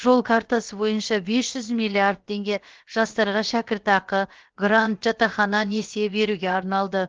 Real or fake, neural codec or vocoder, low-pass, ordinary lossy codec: fake; codec, 16 kHz, about 1 kbps, DyCAST, with the encoder's durations; 7.2 kHz; Opus, 16 kbps